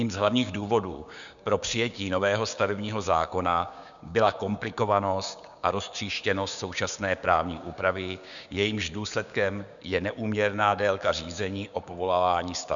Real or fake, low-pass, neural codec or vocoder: fake; 7.2 kHz; codec, 16 kHz, 6 kbps, DAC